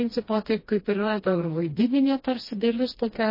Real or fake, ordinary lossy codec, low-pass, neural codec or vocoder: fake; MP3, 24 kbps; 5.4 kHz; codec, 16 kHz, 1 kbps, FreqCodec, smaller model